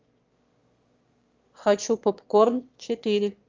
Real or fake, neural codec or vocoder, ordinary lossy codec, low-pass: fake; autoencoder, 22.05 kHz, a latent of 192 numbers a frame, VITS, trained on one speaker; Opus, 32 kbps; 7.2 kHz